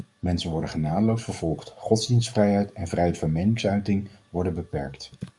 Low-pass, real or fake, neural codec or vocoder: 10.8 kHz; fake; codec, 44.1 kHz, 7.8 kbps, DAC